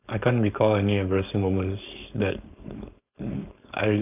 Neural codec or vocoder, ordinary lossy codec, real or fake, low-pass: codec, 16 kHz, 4.8 kbps, FACodec; AAC, 32 kbps; fake; 3.6 kHz